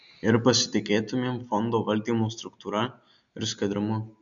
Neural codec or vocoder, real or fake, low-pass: none; real; 7.2 kHz